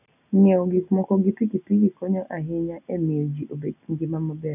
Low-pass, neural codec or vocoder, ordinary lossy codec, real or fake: 3.6 kHz; none; none; real